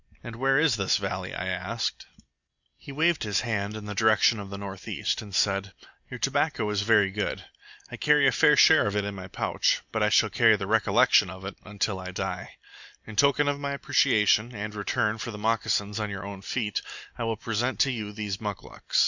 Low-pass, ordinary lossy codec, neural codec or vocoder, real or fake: 7.2 kHz; Opus, 64 kbps; none; real